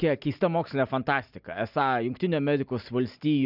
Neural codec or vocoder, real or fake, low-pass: none; real; 5.4 kHz